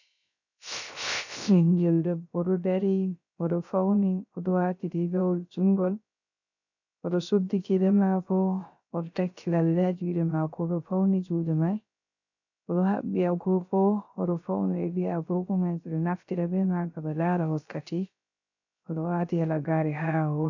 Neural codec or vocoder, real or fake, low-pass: codec, 16 kHz, 0.3 kbps, FocalCodec; fake; 7.2 kHz